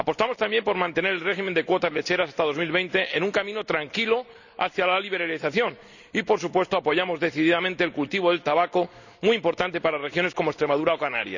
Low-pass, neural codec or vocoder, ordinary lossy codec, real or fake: 7.2 kHz; none; none; real